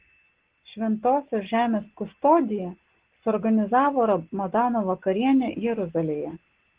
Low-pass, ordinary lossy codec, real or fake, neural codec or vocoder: 3.6 kHz; Opus, 16 kbps; real; none